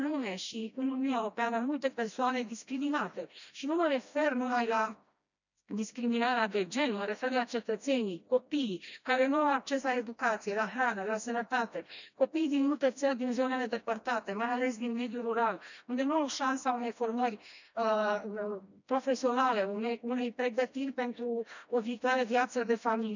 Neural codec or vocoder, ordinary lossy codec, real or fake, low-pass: codec, 16 kHz, 1 kbps, FreqCodec, smaller model; none; fake; 7.2 kHz